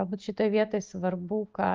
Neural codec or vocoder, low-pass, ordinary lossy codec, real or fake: none; 7.2 kHz; Opus, 24 kbps; real